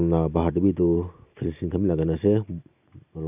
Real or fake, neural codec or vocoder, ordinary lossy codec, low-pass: real; none; none; 3.6 kHz